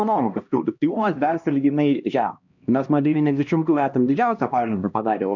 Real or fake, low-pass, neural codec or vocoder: fake; 7.2 kHz; codec, 16 kHz, 1 kbps, X-Codec, HuBERT features, trained on LibriSpeech